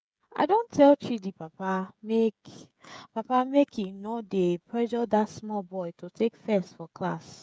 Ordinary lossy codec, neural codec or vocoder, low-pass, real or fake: none; codec, 16 kHz, 16 kbps, FreqCodec, smaller model; none; fake